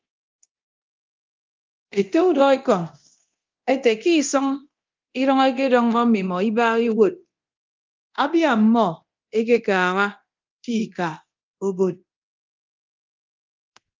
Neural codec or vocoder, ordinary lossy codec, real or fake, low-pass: codec, 24 kHz, 0.9 kbps, DualCodec; Opus, 24 kbps; fake; 7.2 kHz